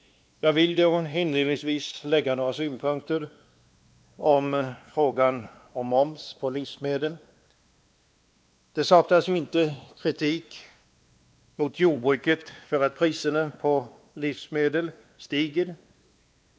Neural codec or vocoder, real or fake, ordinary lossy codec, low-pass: codec, 16 kHz, 2 kbps, X-Codec, WavLM features, trained on Multilingual LibriSpeech; fake; none; none